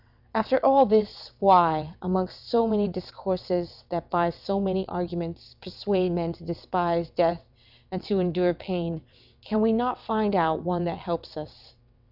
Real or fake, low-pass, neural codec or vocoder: fake; 5.4 kHz; vocoder, 22.05 kHz, 80 mel bands, WaveNeXt